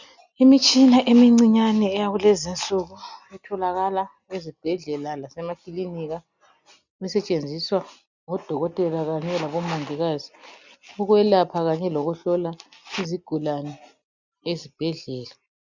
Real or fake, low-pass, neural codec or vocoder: real; 7.2 kHz; none